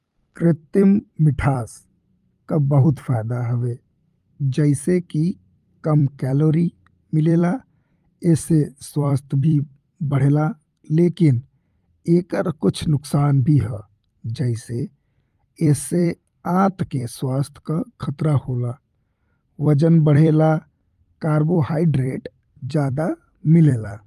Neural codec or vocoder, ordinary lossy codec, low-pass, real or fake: vocoder, 44.1 kHz, 128 mel bands every 256 samples, BigVGAN v2; Opus, 32 kbps; 14.4 kHz; fake